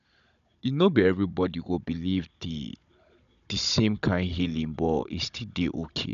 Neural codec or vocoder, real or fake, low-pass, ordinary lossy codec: codec, 16 kHz, 16 kbps, FunCodec, trained on Chinese and English, 50 frames a second; fake; 7.2 kHz; none